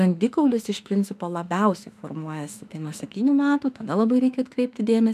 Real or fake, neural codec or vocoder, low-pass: fake; autoencoder, 48 kHz, 32 numbers a frame, DAC-VAE, trained on Japanese speech; 14.4 kHz